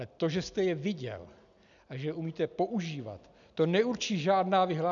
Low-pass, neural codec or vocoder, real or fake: 7.2 kHz; none; real